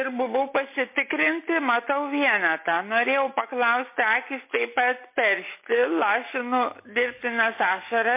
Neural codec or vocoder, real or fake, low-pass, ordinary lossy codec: none; real; 3.6 kHz; MP3, 24 kbps